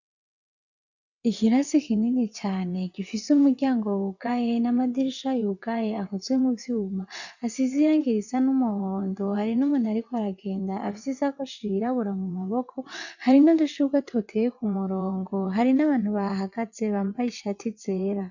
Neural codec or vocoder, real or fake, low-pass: vocoder, 22.05 kHz, 80 mel bands, WaveNeXt; fake; 7.2 kHz